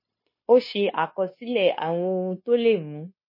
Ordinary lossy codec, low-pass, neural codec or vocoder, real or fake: MP3, 24 kbps; 5.4 kHz; codec, 16 kHz, 0.9 kbps, LongCat-Audio-Codec; fake